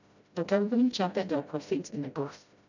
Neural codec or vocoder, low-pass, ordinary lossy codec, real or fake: codec, 16 kHz, 0.5 kbps, FreqCodec, smaller model; 7.2 kHz; none; fake